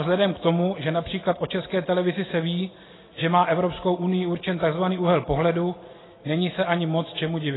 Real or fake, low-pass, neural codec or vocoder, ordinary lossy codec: real; 7.2 kHz; none; AAC, 16 kbps